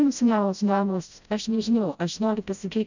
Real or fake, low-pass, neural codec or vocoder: fake; 7.2 kHz; codec, 16 kHz, 0.5 kbps, FreqCodec, smaller model